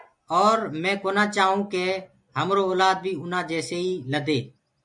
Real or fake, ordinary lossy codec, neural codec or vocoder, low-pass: real; MP3, 64 kbps; none; 10.8 kHz